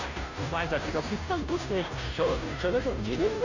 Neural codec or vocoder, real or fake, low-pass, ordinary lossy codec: codec, 16 kHz, 0.5 kbps, FunCodec, trained on Chinese and English, 25 frames a second; fake; 7.2 kHz; none